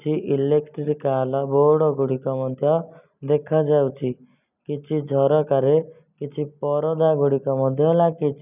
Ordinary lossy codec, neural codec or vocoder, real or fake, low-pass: none; none; real; 3.6 kHz